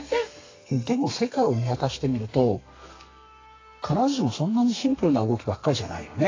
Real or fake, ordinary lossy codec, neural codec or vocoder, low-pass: fake; AAC, 32 kbps; codec, 32 kHz, 1.9 kbps, SNAC; 7.2 kHz